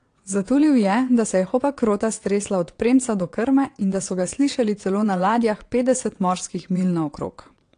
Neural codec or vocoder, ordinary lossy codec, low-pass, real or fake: vocoder, 22.05 kHz, 80 mel bands, WaveNeXt; AAC, 48 kbps; 9.9 kHz; fake